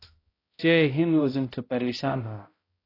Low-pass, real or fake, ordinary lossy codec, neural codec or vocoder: 5.4 kHz; fake; AAC, 24 kbps; codec, 16 kHz, 0.5 kbps, X-Codec, HuBERT features, trained on balanced general audio